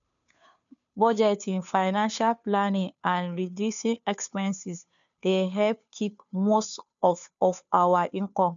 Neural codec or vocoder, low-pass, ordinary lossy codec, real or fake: codec, 16 kHz, 2 kbps, FunCodec, trained on Chinese and English, 25 frames a second; 7.2 kHz; none; fake